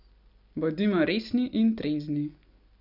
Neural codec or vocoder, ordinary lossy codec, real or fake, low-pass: none; none; real; 5.4 kHz